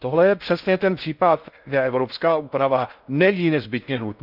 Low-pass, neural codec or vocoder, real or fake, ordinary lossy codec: 5.4 kHz; codec, 16 kHz in and 24 kHz out, 0.6 kbps, FocalCodec, streaming, 4096 codes; fake; none